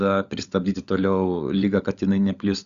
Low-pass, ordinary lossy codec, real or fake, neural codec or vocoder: 7.2 kHz; Opus, 64 kbps; fake; codec, 16 kHz, 16 kbps, FunCodec, trained on LibriTTS, 50 frames a second